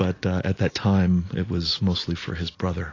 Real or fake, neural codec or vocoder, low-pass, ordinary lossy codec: real; none; 7.2 kHz; AAC, 32 kbps